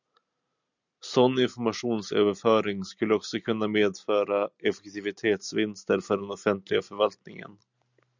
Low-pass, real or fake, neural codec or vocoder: 7.2 kHz; real; none